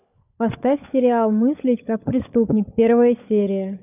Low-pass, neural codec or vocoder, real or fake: 3.6 kHz; codec, 16 kHz, 16 kbps, FunCodec, trained on LibriTTS, 50 frames a second; fake